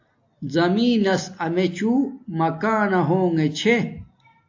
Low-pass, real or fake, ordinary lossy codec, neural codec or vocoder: 7.2 kHz; real; AAC, 48 kbps; none